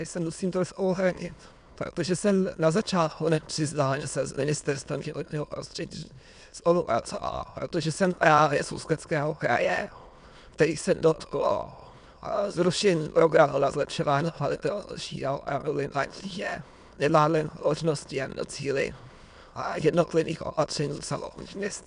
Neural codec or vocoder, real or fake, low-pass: autoencoder, 22.05 kHz, a latent of 192 numbers a frame, VITS, trained on many speakers; fake; 9.9 kHz